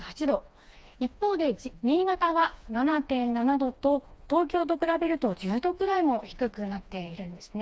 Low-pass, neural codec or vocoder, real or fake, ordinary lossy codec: none; codec, 16 kHz, 2 kbps, FreqCodec, smaller model; fake; none